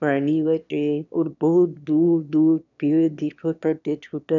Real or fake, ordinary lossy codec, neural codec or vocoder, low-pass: fake; none; autoencoder, 22.05 kHz, a latent of 192 numbers a frame, VITS, trained on one speaker; 7.2 kHz